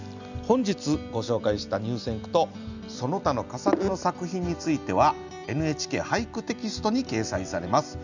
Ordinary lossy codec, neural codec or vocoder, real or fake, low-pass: none; none; real; 7.2 kHz